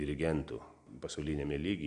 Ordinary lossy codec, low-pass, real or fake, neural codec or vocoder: MP3, 64 kbps; 9.9 kHz; real; none